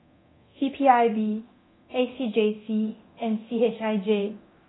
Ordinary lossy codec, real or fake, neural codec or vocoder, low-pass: AAC, 16 kbps; fake; codec, 24 kHz, 0.9 kbps, DualCodec; 7.2 kHz